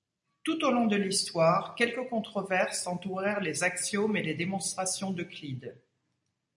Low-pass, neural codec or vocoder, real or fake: 10.8 kHz; none; real